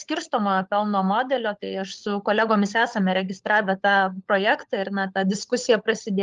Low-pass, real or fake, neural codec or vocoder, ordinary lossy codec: 7.2 kHz; fake; codec, 16 kHz, 16 kbps, FunCodec, trained on LibriTTS, 50 frames a second; Opus, 32 kbps